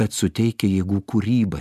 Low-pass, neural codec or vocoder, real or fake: 14.4 kHz; none; real